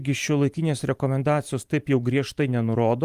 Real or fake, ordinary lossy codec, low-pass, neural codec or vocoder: fake; Opus, 32 kbps; 14.4 kHz; vocoder, 44.1 kHz, 128 mel bands every 512 samples, BigVGAN v2